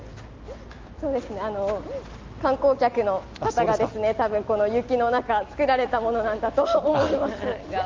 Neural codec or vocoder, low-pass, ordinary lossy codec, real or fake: none; 7.2 kHz; Opus, 24 kbps; real